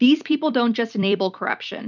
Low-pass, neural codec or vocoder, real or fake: 7.2 kHz; vocoder, 44.1 kHz, 128 mel bands every 256 samples, BigVGAN v2; fake